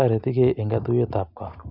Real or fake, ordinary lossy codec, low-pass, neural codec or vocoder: real; none; 5.4 kHz; none